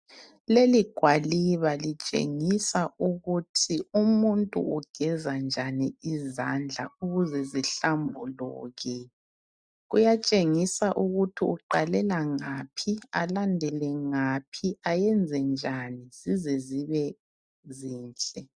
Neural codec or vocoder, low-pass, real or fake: none; 9.9 kHz; real